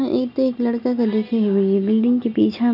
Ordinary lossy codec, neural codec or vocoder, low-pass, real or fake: none; none; 5.4 kHz; real